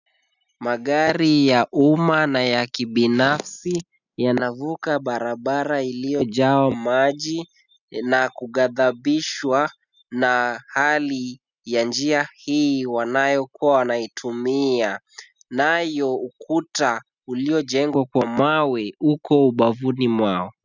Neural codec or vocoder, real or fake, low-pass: none; real; 7.2 kHz